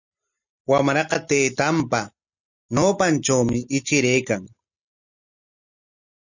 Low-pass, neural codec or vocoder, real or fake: 7.2 kHz; none; real